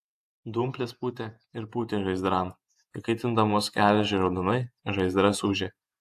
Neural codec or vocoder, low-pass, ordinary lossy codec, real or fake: vocoder, 48 kHz, 128 mel bands, Vocos; 14.4 kHz; AAC, 96 kbps; fake